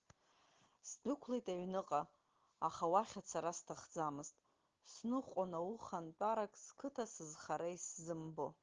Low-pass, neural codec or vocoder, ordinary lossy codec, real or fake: 7.2 kHz; none; Opus, 16 kbps; real